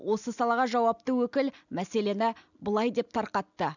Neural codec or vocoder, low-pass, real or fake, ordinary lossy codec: none; 7.2 kHz; real; none